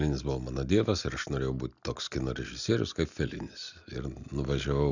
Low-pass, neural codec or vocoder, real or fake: 7.2 kHz; none; real